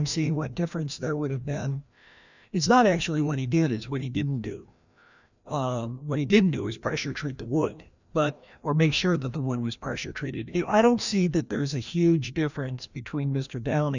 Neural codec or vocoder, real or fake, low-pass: codec, 16 kHz, 1 kbps, FreqCodec, larger model; fake; 7.2 kHz